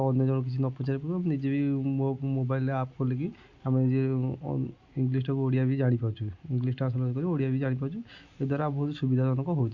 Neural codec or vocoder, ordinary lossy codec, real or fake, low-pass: none; Opus, 64 kbps; real; 7.2 kHz